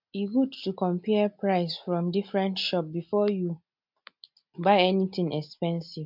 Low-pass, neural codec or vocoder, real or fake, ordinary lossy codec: 5.4 kHz; none; real; MP3, 48 kbps